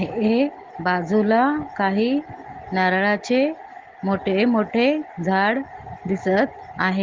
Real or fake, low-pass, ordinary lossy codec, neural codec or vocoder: real; 7.2 kHz; Opus, 16 kbps; none